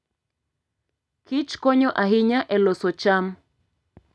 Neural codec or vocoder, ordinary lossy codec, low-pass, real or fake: none; none; none; real